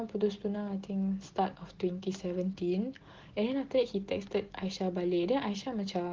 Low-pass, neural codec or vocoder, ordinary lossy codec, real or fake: 7.2 kHz; none; Opus, 16 kbps; real